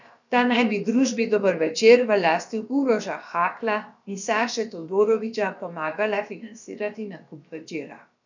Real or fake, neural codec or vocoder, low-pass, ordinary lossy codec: fake; codec, 16 kHz, about 1 kbps, DyCAST, with the encoder's durations; 7.2 kHz; none